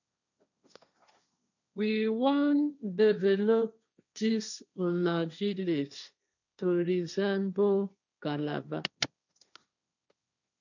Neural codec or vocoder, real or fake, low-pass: codec, 16 kHz, 1.1 kbps, Voila-Tokenizer; fake; 7.2 kHz